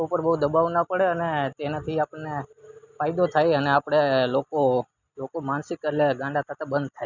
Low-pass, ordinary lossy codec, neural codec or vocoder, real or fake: 7.2 kHz; none; none; real